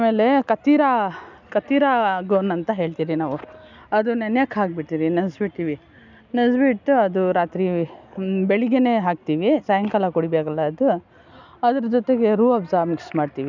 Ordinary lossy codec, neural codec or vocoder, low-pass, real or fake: none; none; 7.2 kHz; real